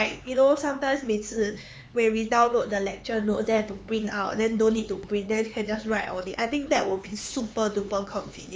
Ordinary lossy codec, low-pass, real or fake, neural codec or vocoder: none; none; fake; codec, 16 kHz, 4 kbps, X-Codec, HuBERT features, trained on LibriSpeech